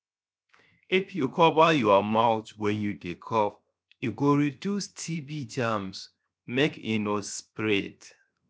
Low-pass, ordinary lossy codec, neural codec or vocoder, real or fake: none; none; codec, 16 kHz, 0.7 kbps, FocalCodec; fake